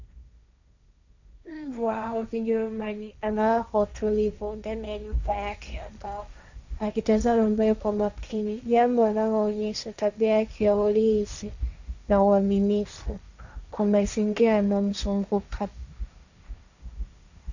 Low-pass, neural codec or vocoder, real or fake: 7.2 kHz; codec, 16 kHz, 1.1 kbps, Voila-Tokenizer; fake